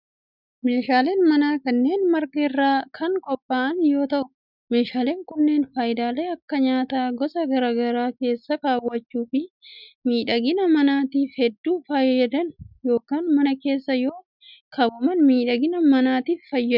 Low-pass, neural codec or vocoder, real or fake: 5.4 kHz; none; real